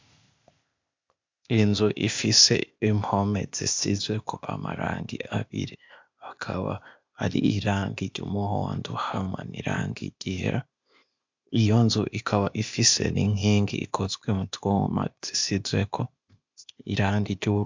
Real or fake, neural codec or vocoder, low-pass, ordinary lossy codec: fake; codec, 16 kHz, 0.8 kbps, ZipCodec; 7.2 kHz; MP3, 64 kbps